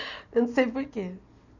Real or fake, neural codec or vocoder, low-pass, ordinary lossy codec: real; none; 7.2 kHz; none